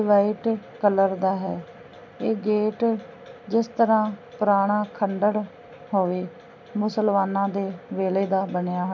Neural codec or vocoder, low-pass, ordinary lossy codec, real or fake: none; 7.2 kHz; none; real